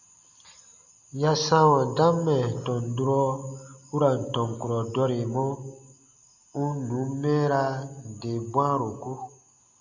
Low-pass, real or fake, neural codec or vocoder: 7.2 kHz; real; none